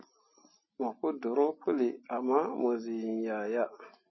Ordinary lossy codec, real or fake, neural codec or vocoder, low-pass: MP3, 24 kbps; real; none; 7.2 kHz